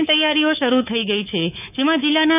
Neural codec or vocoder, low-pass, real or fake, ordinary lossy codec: codec, 24 kHz, 3.1 kbps, DualCodec; 3.6 kHz; fake; none